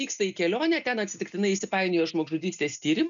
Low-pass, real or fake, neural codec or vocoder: 7.2 kHz; real; none